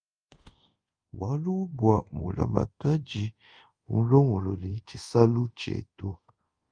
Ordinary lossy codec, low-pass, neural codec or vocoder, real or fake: Opus, 32 kbps; 9.9 kHz; codec, 24 kHz, 0.5 kbps, DualCodec; fake